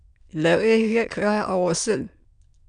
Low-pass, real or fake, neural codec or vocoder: 9.9 kHz; fake; autoencoder, 22.05 kHz, a latent of 192 numbers a frame, VITS, trained on many speakers